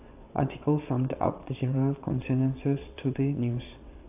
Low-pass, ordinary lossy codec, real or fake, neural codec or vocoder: 3.6 kHz; AAC, 32 kbps; fake; vocoder, 22.05 kHz, 80 mel bands, WaveNeXt